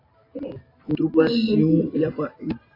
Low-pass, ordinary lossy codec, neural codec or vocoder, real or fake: 5.4 kHz; AAC, 24 kbps; none; real